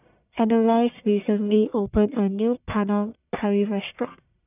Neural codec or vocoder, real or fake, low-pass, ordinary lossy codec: codec, 44.1 kHz, 1.7 kbps, Pupu-Codec; fake; 3.6 kHz; none